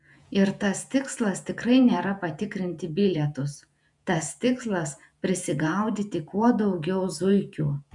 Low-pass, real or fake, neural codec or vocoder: 10.8 kHz; fake; vocoder, 48 kHz, 128 mel bands, Vocos